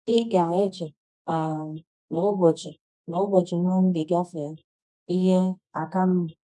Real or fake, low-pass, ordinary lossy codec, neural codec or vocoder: fake; 10.8 kHz; none; codec, 24 kHz, 0.9 kbps, WavTokenizer, medium music audio release